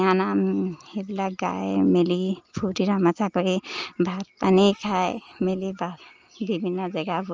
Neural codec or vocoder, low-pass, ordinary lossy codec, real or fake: none; 7.2 kHz; Opus, 24 kbps; real